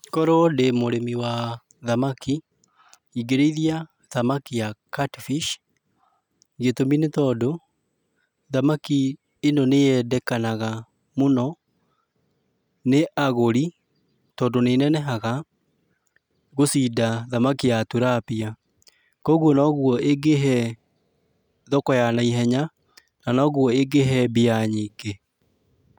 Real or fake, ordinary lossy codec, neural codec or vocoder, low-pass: real; none; none; 19.8 kHz